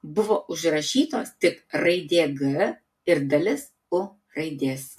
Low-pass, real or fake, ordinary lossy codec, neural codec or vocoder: 14.4 kHz; real; MP3, 64 kbps; none